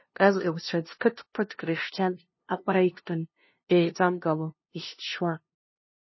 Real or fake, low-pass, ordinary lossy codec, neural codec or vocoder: fake; 7.2 kHz; MP3, 24 kbps; codec, 16 kHz, 0.5 kbps, FunCodec, trained on LibriTTS, 25 frames a second